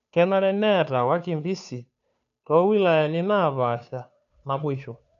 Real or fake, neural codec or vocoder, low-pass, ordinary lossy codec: fake; codec, 16 kHz, 2 kbps, FunCodec, trained on Chinese and English, 25 frames a second; 7.2 kHz; none